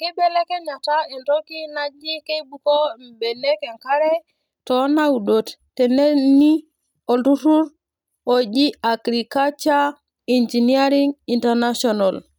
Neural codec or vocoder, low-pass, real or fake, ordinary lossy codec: none; none; real; none